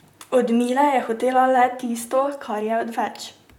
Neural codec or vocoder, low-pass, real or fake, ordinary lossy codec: vocoder, 44.1 kHz, 128 mel bands, Pupu-Vocoder; 19.8 kHz; fake; none